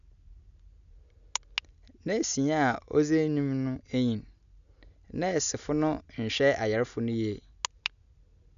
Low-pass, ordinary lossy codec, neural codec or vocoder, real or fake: 7.2 kHz; none; none; real